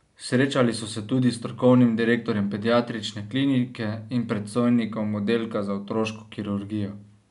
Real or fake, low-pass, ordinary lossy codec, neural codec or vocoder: real; 10.8 kHz; none; none